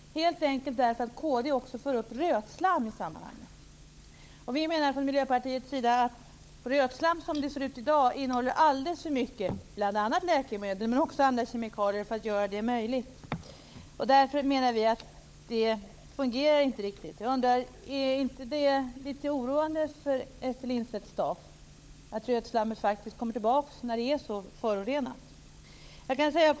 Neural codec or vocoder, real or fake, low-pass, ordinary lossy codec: codec, 16 kHz, 16 kbps, FunCodec, trained on LibriTTS, 50 frames a second; fake; none; none